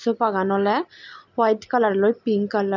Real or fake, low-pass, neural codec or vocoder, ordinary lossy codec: real; 7.2 kHz; none; none